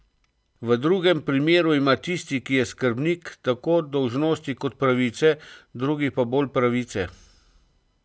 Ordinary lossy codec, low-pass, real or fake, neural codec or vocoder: none; none; real; none